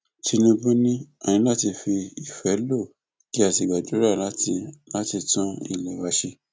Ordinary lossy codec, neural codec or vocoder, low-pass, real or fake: none; none; none; real